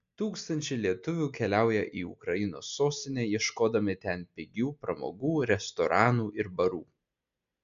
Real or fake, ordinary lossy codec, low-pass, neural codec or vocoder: real; MP3, 64 kbps; 7.2 kHz; none